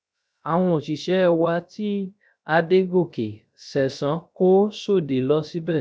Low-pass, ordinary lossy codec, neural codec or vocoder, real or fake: none; none; codec, 16 kHz, 0.3 kbps, FocalCodec; fake